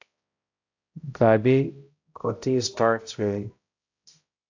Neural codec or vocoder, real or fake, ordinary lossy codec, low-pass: codec, 16 kHz, 0.5 kbps, X-Codec, HuBERT features, trained on balanced general audio; fake; AAC, 48 kbps; 7.2 kHz